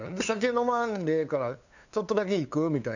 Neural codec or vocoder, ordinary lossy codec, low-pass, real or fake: codec, 16 kHz, 2 kbps, FunCodec, trained on LibriTTS, 25 frames a second; none; 7.2 kHz; fake